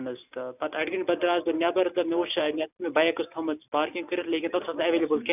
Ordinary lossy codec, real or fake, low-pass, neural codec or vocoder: none; real; 3.6 kHz; none